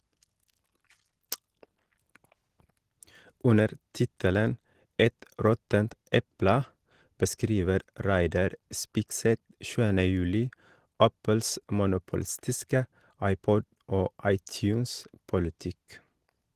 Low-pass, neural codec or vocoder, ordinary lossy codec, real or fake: 14.4 kHz; none; Opus, 16 kbps; real